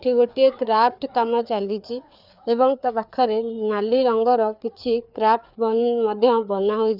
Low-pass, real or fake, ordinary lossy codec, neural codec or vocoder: 5.4 kHz; fake; none; codec, 16 kHz, 4 kbps, FreqCodec, larger model